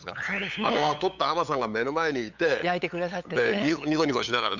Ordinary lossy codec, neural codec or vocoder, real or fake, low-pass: none; codec, 16 kHz, 8 kbps, FunCodec, trained on LibriTTS, 25 frames a second; fake; 7.2 kHz